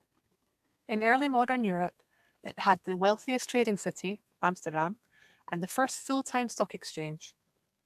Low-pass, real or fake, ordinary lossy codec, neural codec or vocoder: 14.4 kHz; fake; AAC, 96 kbps; codec, 32 kHz, 1.9 kbps, SNAC